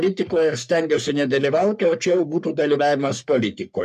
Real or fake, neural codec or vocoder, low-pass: fake; codec, 44.1 kHz, 3.4 kbps, Pupu-Codec; 14.4 kHz